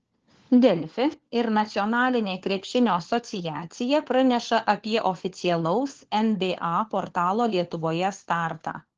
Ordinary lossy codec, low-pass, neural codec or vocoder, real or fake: Opus, 16 kbps; 7.2 kHz; codec, 16 kHz, 4 kbps, FunCodec, trained on LibriTTS, 50 frames a second; fake